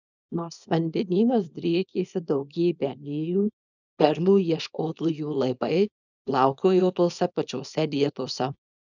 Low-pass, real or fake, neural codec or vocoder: 7.2 kHz; fake; codec, 24 kHz, 0.9 kbps, WavTokenizer, small release